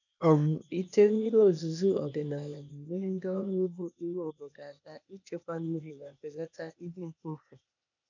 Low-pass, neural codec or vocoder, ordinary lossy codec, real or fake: 7.2 kHz; codec, 16 kHz, 0.8 kbps, ZipCodec; none; fake